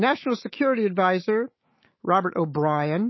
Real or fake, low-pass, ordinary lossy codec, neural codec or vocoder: fake; 7.2 kHz; MP3, 24 kbps; autoencoder, 48 kHz, 128 numbers a frame, DAC-VAE, trained on Japanese speech